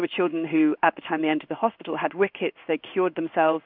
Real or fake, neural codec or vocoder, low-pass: fake; codec, 16 kHz in and 24 kHz out, 1 kbps, XY-Tokenizer; 5.4 kHz